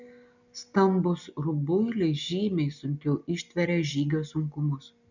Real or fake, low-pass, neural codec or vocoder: real; 7.2 kHz; none